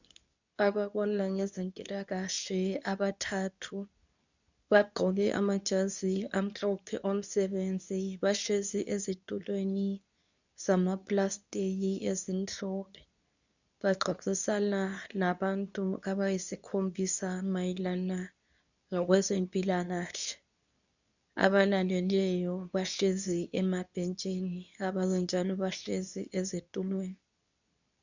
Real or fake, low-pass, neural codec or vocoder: fake; 7.2 kHz; codec, 24 kHz, 0.9 kbps, WavTokenizer, medium speech release version 2